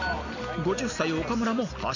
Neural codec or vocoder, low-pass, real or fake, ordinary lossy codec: none; 7.2 kHz; real; none